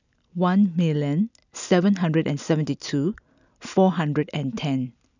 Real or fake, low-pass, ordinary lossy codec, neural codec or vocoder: real; 7.2 kHz; none; none